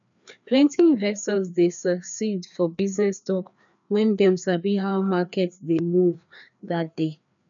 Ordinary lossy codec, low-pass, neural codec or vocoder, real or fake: none; 7.2 kHz; codec, 16 kHz, 2 kbps, FreqCodec, larger model; fake